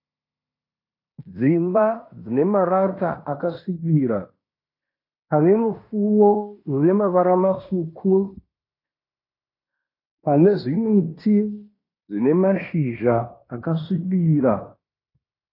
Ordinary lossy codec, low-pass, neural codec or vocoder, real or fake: AAC, 32 kbps; 5.4 kHz; codec, 16 kHz in and 24 kHz out, 0.9 kbps, LongCat-Audio-Codec, fine tuned four codebook decoder; fake